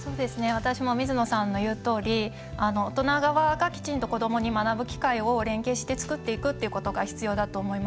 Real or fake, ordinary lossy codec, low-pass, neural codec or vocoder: real; none; none; none